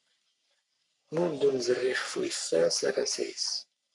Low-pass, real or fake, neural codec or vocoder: 10.8 kHz; fake; codec, 44.1 kHz, 3.4 kbps, Pupu-Codec